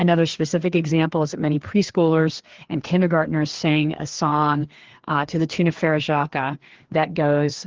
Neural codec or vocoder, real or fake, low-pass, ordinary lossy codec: codec, 16 kHz, 2 kbps, FreqCodec, larger model; fake; 7.2 kHz; Opus, 16 kbps